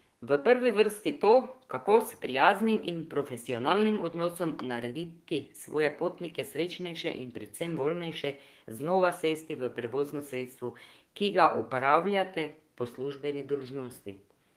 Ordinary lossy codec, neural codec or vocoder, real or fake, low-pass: Opus, 24 kbps; codec, 32 kHz, 1.9 kbps, SNAC; fake; 14.4 kHz